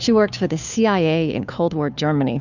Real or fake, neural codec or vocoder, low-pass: fake; codec, 16 kHz, 2 kbps, FunCodec, trained on Chinese and English, 25 frames a second; 7.2 kHz